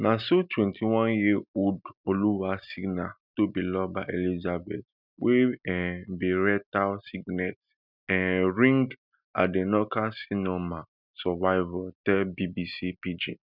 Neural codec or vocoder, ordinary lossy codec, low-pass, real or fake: none; none; 5.4 kHz; real